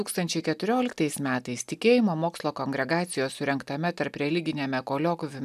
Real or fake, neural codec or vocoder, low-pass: real; none; 14.4 kHz